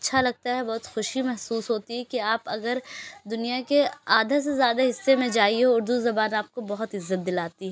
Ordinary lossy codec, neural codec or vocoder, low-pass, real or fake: none; none; none; real